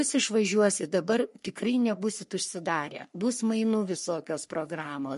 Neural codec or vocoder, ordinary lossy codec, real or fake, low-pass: codec, 44.1 kHz, 3.4 kbps, Pupu-Codec; MP3, 48 kbps; fake; 14.4 kHz